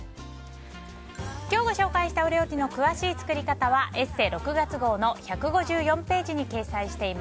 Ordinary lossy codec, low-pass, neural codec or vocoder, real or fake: none; none; none; real